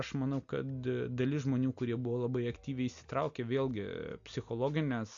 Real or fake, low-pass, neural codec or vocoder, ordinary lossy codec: real; 7.2 kHz; none; AAC, 64 kbps